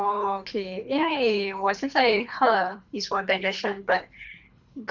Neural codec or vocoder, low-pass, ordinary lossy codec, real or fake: codec, 24 kHz, 3 kbps, HILCodec; 7.2 kHz; none; fake